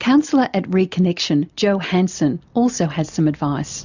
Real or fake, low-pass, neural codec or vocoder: real; 7.2 kHz; none